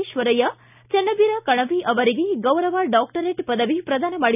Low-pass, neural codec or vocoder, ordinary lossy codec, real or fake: 3.6 kHz; none; none; real